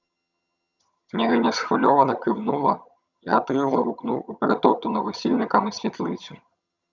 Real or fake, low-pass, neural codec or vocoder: fake; 7.2 kHz; vocoder, 22.05 kHz, 80 mel bands, HiFi-GAN